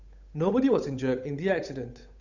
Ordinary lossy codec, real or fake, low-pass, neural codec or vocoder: none; fake; 7.2 kHz; codec, 16 kHz, 8 kbps, FunCodec, trained on Chinese and English, 25 frames a second